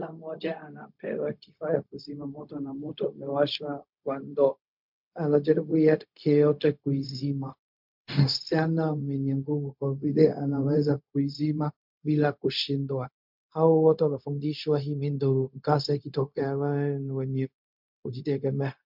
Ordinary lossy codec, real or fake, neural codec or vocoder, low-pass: MP3, 48 kbps; fake; codec, 16 kHz, 0.4 kbps, LongCat-Audio-Codec; 5.4 kHz